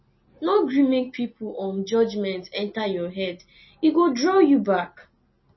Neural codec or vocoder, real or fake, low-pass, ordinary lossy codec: none; real; 7.2 kHz; MP3, 24 kbps